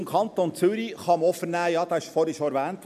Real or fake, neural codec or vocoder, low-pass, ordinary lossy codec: real; none; 14.4 kHz; none